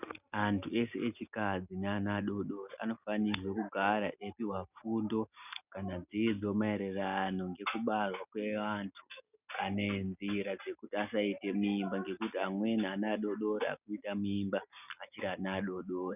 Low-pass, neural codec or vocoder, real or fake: 3.6 kHz; none; real